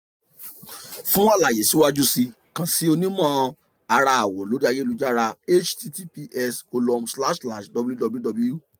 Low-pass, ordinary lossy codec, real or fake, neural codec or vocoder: none; none; real; none